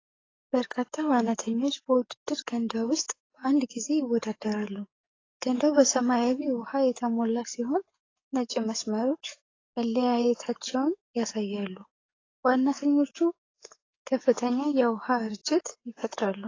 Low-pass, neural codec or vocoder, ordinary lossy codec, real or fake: 7.2 kHz; codec, 44.1 kHz, 7.8 kbps, DAC; AAC, 32 kbps; fake